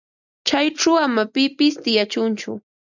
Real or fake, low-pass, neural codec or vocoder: real; 7.2 kHz; none